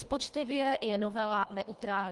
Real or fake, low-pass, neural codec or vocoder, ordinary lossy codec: fake; 10.8 kHz; codec, 24 kHz, 1.5 kbps, HILCodec; Opus, 24 kbps